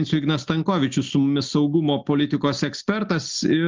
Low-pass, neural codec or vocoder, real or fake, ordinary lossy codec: 7.2 kHz; none; real; Opus, 24 kbps